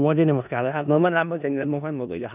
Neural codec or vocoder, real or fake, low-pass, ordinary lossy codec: codec, 16 kHz in and 24 kHz out, 0.4 kbps, LongCat-Audio-Codec, four codebook decoder; fake; 3.6 kHz; none